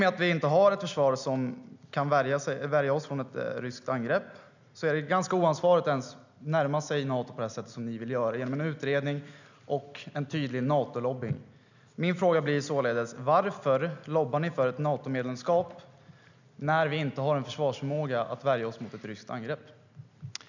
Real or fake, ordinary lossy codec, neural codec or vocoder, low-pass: real; none; none; 7.2 kHz